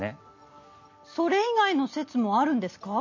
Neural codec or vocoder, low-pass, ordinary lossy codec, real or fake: none; 7.2 kHz; MP3, 32 kbps; real